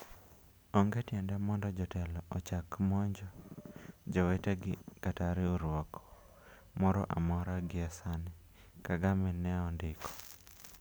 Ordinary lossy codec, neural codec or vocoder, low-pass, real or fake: none; none; none; real